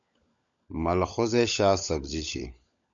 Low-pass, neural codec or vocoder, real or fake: 7.2 kHz; codec, 16 kHz, 16 kbps, FunCodec, trained on LibriTTS, 50 frames a second; fake